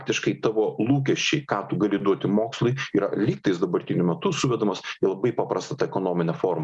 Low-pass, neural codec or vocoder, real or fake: 10.8 kHz; none; real